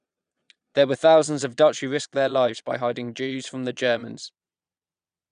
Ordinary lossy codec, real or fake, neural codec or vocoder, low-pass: none; fake; vocoder, 22.05 kHz, 80 mel bands, Vocos; 9.9 kHz